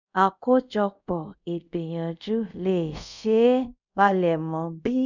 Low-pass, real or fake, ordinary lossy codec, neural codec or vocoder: 7.2 kHz; fake; none; codec, 24 kHz, 0.5 kbps, DualCodec